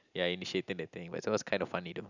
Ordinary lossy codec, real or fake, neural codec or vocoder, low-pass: none; real; none; 7.2 kHz